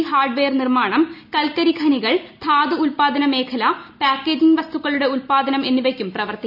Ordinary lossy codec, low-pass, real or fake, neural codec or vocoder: none; 5.4 kHz; real; none